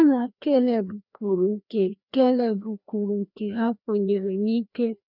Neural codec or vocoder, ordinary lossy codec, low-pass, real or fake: codec, 16 kHz, 1 kbps, FreqCodec, larger model; none; 5.4 kHz; fake